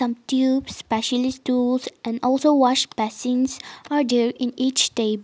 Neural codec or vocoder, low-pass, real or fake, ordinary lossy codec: none; none; real; none